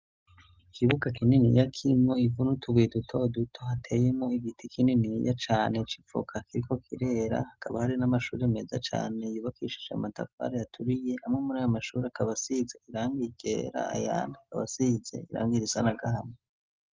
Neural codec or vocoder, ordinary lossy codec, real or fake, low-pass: none; Opus, 32 kbps; real; 7.2 kHz